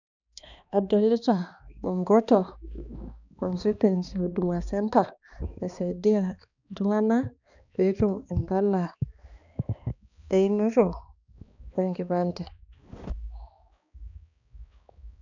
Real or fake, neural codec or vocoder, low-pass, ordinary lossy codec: fake; codec, 16 kHz, 2 kbps, X-Codec, HuBERT features, trained on balanced general audio; 7.2 kHz; none